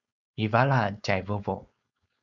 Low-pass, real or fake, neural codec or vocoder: 7.2 kHz; fake; codec, 16 kHz, 4.8 kbps, FACodec